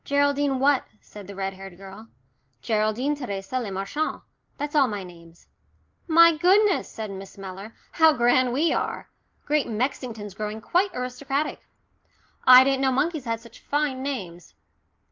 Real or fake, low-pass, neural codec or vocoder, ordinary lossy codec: real; 7.2 kHz; none; Opus, 32 kbps